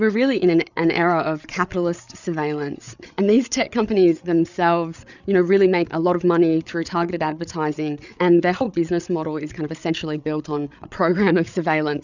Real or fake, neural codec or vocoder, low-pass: fake; codec, 16 kHz, 8 kbps, FreqCodec, larger model; 7.2 kHz